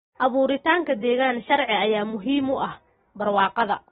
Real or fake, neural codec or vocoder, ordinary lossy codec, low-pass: real; none; AAC, 16 kbps; 7.2 kHz